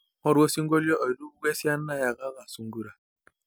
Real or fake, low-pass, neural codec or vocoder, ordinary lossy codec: real; none; none; none